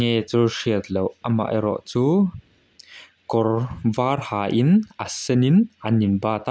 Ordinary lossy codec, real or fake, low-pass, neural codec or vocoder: none; real; none; none